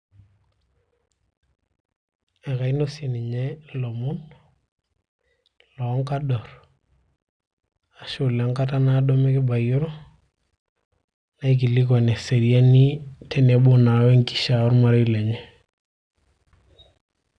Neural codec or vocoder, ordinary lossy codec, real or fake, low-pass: none; none; real; 9.9 kHz